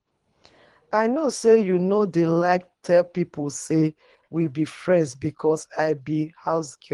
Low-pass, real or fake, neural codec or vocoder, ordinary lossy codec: 10.8 kHz; fake; codec, 24 kHz, 3 kbps, HILCodec; Opus, 24 kbps